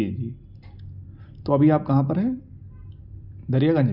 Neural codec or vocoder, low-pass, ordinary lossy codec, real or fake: none; 5.4 kHz; none; real